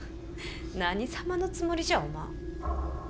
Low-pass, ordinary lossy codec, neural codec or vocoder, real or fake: none; none; none; real